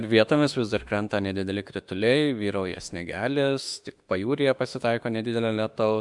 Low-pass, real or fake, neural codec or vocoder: 10.8 kHz; fake; autoencoder, 48 kHz, 32 numbers a frame, DAC-VAE, trained on Japanese speech